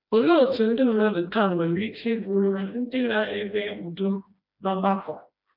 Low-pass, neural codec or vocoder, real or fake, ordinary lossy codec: 5.4 kHz; codec, 16 kHz, 1 kbps, FreqCodec, smaller model; fake; none